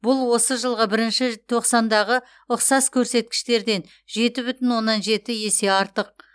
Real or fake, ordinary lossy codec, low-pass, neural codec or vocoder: real; none; none; none